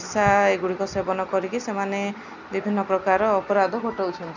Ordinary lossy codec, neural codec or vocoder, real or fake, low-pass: none; none; real; 7.2 kHz